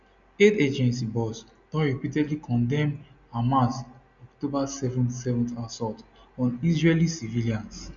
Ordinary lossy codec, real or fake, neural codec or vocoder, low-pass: none; real; none; 7.2 kHz